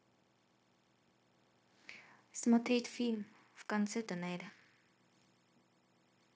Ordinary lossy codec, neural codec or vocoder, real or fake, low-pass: none; codec, 16 kHz, 0.9 kbps, LongCat-Audio-Codec; fake; none